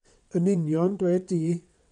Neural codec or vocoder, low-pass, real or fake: vocoder, 22.05 kHz, 80 mel bands, WaveNeXt; 9.9 kHz; fake